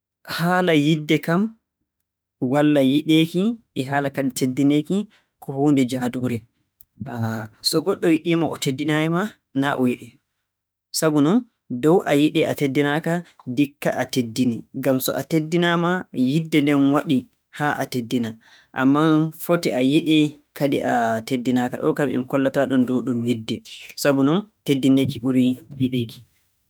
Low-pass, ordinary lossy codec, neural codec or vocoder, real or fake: none; none; autoencoder, 48 kHz, 32 numbers a frame, DAC-VAE, trained on Japanese speech; fake